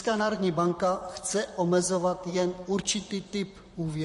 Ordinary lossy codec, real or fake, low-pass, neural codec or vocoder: MP3, 48 kbps; fake; 14.4 kHz; vocoder, 44.1 kHz, 128 mel bands, Pupu-Vocoder